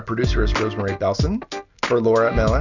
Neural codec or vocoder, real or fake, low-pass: none; real; 7.2 kHz